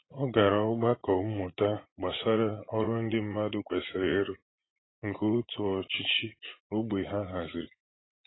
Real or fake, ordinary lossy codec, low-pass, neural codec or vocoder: fake; AAC, 16 kbps; 7.2 kHz; vocoder, 44.1 kHz, 80 mel bands, Vocos